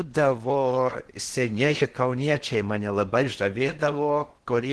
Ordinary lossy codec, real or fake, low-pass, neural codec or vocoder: Opus, 16 kbps; fake; 10.8 kHz; codec, 16 kHz in and 24 kHz out, 0.8 kbps, FocalCodec, streaming, 65536 codes